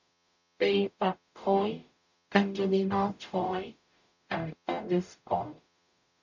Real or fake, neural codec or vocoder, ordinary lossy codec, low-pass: fake; codec, 44.1 kHz, 0.9 kbps, DAC; none; 7.2 kHz